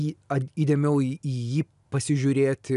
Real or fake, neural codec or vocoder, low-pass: real; none; 10.8 kHz